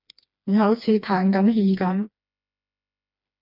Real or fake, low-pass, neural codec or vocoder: fake; 5.4 kHz; codec, 16 kHz, 2 kbps, FreqCodec, smaller model